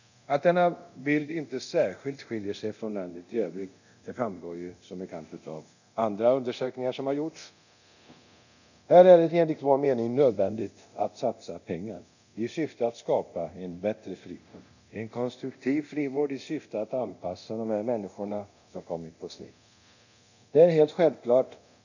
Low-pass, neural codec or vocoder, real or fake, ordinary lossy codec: 7.2 kHz; codec, 24 kHz, 0.9 kbps, DualCodec; fake; none